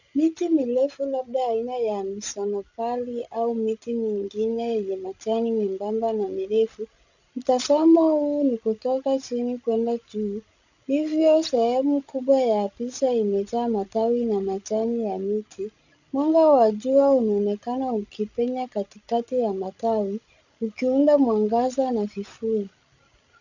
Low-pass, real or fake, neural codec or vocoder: 7.2 kHz; fake; codec, 16 kHz, 16 kbps, FreqCodec, larger model